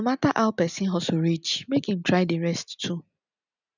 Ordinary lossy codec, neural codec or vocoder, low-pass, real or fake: none; none; 7.2 kHz; real